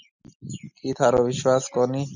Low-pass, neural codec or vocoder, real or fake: 7.2 kHz; none; real